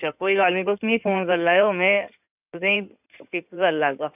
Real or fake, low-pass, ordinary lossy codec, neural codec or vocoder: fake; 3.6 kHz; none; codec, 16 kHz in and 24 kHz out, 2.2 kbps, FireRedTTS-2 codec